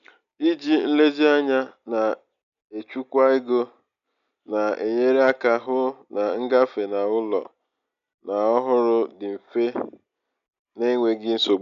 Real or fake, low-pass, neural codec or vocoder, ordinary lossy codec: real; 7.2 kHz; none; none